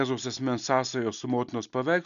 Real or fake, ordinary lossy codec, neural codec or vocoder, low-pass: real; MP3, 96 kbps; none; 7.2 kHz